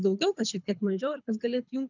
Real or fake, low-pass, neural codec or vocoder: fake; 7.2 kHz; codec, 24 kHz, 6 kbps, HILCodec